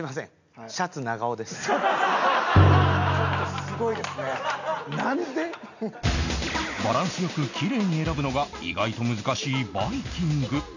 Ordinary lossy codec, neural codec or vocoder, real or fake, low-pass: none; none; real; 7.2 kHz